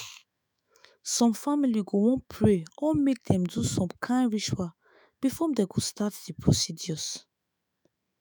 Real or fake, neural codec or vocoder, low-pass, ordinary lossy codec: fake; autoencoder, 48 kHz, 128 numbers a frame, DAC-VAE, trained on Japanese speech; none; none